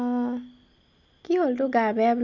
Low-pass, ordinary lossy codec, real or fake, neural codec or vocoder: 7.2 kHz; none; real; none